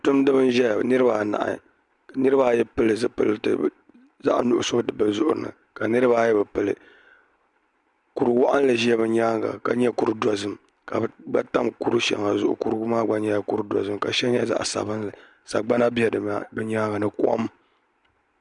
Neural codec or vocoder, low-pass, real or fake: none; 10.8 kHz; real